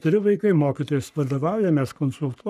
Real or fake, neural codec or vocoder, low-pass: fake; codec, 44.1 kHz, 3.4 kbps, Pupu-Codec; 14.4 kHz